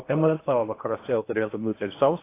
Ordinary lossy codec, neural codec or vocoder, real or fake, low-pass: AAC, 24 kbps; codec, 16 kHz in and 24 kHz out, 0.8 kbps, FocalCodec, streaming, 65536 codes; fake; 3.6 kHz